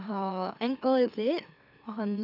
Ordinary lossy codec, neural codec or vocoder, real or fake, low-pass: none; autoencoder, 44.1 kHz, a latent of 192 numbers a frame, MeloTTS; fake; 5.4 kHz